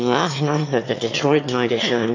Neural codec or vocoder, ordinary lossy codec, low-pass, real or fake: autoencoder, 22.05 kHz, a latent of 192 numbers a frame, VITS, trained on one speaker; AAC, 48 kbps; 7.2 kHz; fake